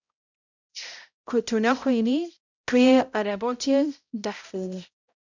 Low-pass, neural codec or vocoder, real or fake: 7.2 kHz; codec, 16 kHz, 0.5 kbps, X-Codec, HuBERT features, trained on balanced general audio; fake